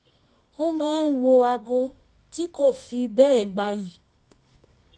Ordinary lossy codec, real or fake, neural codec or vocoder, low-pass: none; fake; codec, 24 kHz, 0.9 kbps, WavTokenizer, medium music audio release; none